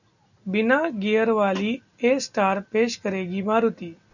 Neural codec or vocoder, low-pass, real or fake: none; 7.2 kHz; real